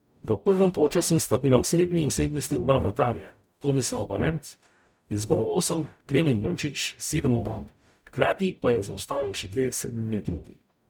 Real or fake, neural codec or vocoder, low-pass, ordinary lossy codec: fake; codec, 44.1 kHz, 0.9 kbps, DAC; none; none